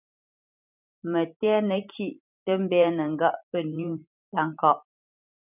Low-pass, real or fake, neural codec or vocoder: 3.6 kHz; fake; vocoder, 44.1 kHz, 128 mel bands every 512 samples, BigVGAN v2